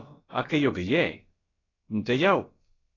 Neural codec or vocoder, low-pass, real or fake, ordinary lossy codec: codec, 16 kHz, about 1 kbps, DyCAST, with the encoder's durations; 7.2 kHz; fake; AAC, 32 kbps